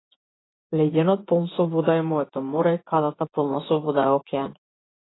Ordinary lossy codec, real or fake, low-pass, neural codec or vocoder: AAC, 16 kbps; fake; 7.2 kHz; vocoder, 24 kHz, 100 mel bands, Vocos